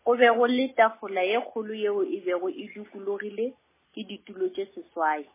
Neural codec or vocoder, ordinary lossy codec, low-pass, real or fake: none; MP3, 16 kbps; 3.6 kHz; real